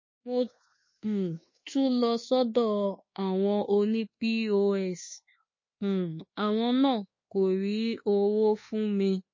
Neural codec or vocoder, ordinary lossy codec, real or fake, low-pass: codec, 24 kHz, 1.2 kbps, DualCodec; MP3, 32 kbps; fake; 7.2 kHz